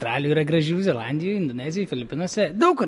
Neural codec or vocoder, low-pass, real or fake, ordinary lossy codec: none; 14.4 kHz; real; MP3, 48 kbps